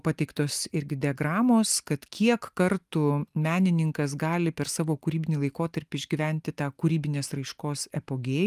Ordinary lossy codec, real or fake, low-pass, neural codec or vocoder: Opus, 32 kbps; real; 14.4 kHz; none